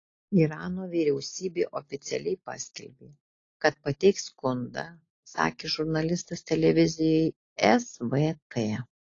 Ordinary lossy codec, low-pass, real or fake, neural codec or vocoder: AAC, 32 kbps; 7.2 kHz; real; none